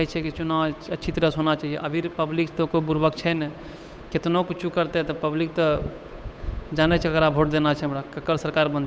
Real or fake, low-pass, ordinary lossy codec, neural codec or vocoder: fake; none; none; codec, 16 kHz, 8 kbps, FunCodec, trained on Chinese and English, 25 frames a second